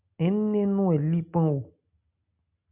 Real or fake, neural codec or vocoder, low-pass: real; none; 3.6 kHz